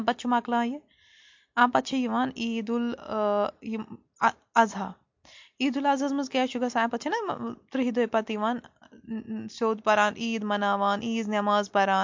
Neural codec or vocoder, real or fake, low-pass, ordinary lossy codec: none; real; 7.2 kHz; MP3, 48 kbps